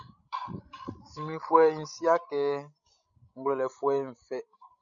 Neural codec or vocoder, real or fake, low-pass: codec, 16 kHz, 16 kbps, FreqCodec, larger model; fake; 7.2 kHz